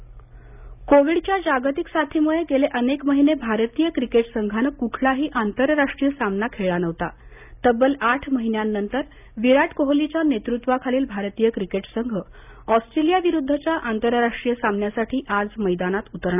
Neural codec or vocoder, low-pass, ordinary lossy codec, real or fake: none; 3.6 kHz; none; real